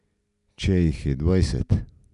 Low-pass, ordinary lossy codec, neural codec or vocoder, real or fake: 10.8 kHz; none; none; real